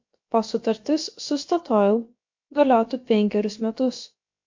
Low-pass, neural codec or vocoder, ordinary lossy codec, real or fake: 7.2 kHz; codec, 16 kHz, about 1 kbps, DyCAST, with the encoder's durations; MP3, 48 kbps; fake